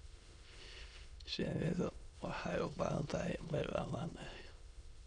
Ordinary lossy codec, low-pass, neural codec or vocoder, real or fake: none; 9.9 kHz; autoencoder, 22.05 kHz, a latent of 192 numbers a frame, VITS, trained on many speakers; fake